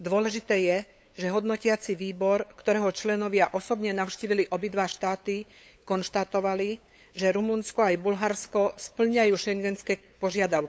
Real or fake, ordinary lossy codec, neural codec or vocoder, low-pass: fake; none; codec, 16 kHz, 8 kbps, FunCodec, trained on LibriTTS, 25 frames a second; none